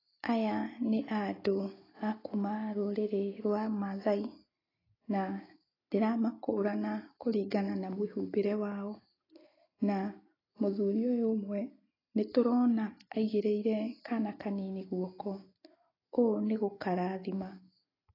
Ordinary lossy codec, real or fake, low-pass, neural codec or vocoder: AAC, 24 kbps; real; 5.4 kHz; none